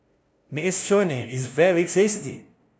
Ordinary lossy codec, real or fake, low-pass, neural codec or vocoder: none; fake; none; codec, 16 kHz, 0.5 kbps, FunCodec, trained on LibriTTS, 25 frames a second